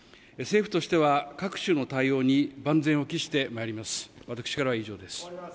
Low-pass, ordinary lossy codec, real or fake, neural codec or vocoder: none; none; real; none